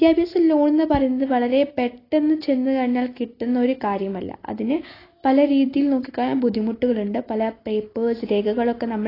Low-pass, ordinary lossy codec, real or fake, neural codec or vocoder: 5.4 kHz; AAC, 24 kbps; real; none